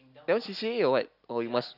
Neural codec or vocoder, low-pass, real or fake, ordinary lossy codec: none; 5.4 kHz; real; none